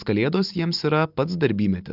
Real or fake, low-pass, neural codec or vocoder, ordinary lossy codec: real; 5.4 kHz; none; Opus, 32 kbps